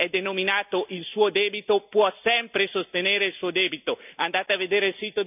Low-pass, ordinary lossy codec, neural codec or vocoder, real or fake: 3.6 kHz; none; none; real